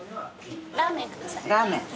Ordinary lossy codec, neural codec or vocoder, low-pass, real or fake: none; none; none; real